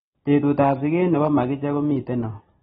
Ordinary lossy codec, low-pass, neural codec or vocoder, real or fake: AAC, 16 kbps; 7.2 kHz; none; real